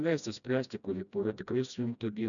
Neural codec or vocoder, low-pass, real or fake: codec, 16 kHz, 1 kbps, FreqCodec, smaller model; 7.2 kHz; fake